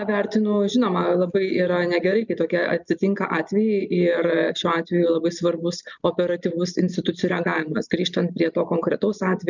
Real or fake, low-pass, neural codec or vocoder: real; 7.2 kHz; none